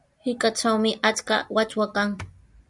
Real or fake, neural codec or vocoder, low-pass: real; none; 10.8 kHz